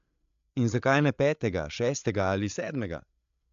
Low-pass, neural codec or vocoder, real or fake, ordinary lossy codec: 7.2 kHz; codec, 16 kHz, 8 kbps, FreqCodec, larger model; fake; AAC, 96 kbps